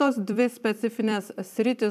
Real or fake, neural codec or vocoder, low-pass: fake; vocoder, 44.1 kHz, 128 mel bands every 256 samples, BigVGAN v2; 14.4 kHz